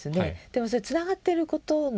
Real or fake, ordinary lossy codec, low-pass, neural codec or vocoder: real; none; none; none